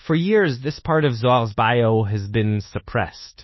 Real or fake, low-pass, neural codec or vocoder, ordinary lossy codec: fake; 7.2 kHz; codec, 24 kHz, 1.2 kbps, DualCodec; MP3, 24 kbps